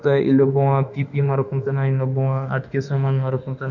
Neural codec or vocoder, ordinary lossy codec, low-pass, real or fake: autoencoder, 48 kHz, 32 numbers a frame, DAC-VAE, trained on Japanese speech; none; 7.2 kHz; fake